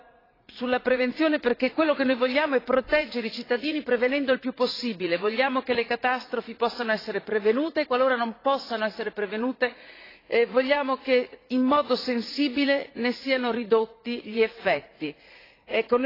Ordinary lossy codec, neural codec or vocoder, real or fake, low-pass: AAC, 24 kbps; vocoder, 44.1 kHz, 80 mel bands, Vocos; fake; 5.4 kHz